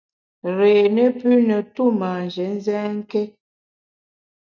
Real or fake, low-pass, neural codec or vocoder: real; 7.2 kHz; none